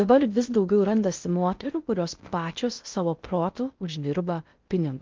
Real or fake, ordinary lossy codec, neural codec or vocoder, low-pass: fake; Opus, 32 kbps; codec, 16 kHz in and 24 kHz out, 0.6 kbps, FocalCodec, streaming, 2048 codes; 7.2 kHz